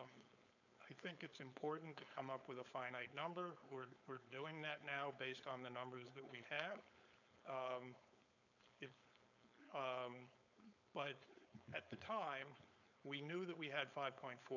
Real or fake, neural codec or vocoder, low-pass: fake; codec, 16 kHz, 4.8 kbps, FACodec; 7.2 kHz